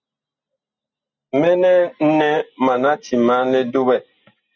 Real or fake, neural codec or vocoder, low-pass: real; none; 7.2 kHz